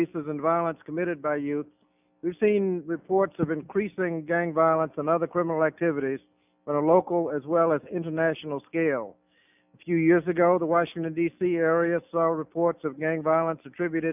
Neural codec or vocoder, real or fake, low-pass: none; real; 3.6 kHz